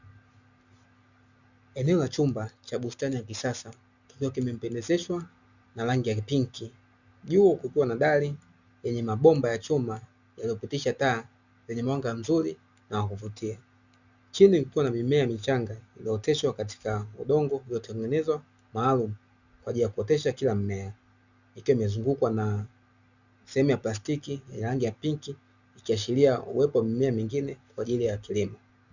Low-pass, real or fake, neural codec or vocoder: 7.2 kHz; real; none